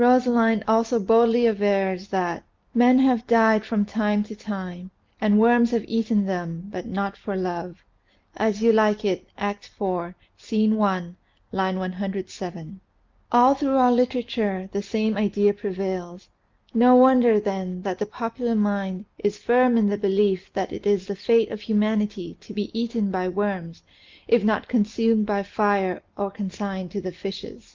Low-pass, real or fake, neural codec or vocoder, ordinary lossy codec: 7.2 kHz; real; none; Opus, 24 kbps